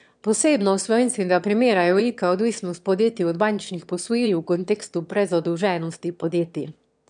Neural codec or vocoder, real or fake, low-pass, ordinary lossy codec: autoencoder, 22.05 kHz, a latent of 192 numbers a frame, VITS, trained on one speaker; fake; 9.9 kHz; none